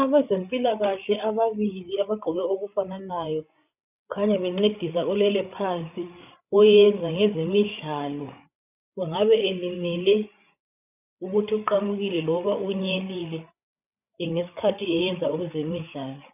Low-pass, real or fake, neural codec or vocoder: 3.6 kHz; fake; codec, 16 kHz, 8 kbps, FreqCodec, larger model